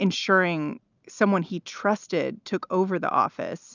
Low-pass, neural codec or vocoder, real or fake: 7.2 kHz; none; real